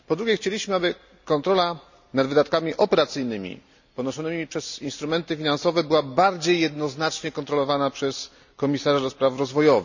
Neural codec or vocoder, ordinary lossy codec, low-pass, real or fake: none; none; 7.2 kHz; real